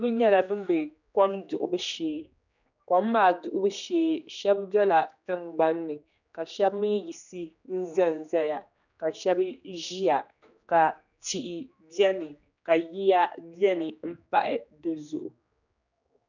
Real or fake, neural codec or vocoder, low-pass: fake; codec, 16 kHz, 2 kbps, X-Codec, HuBERT features, trained on general audio; 7.2 kHz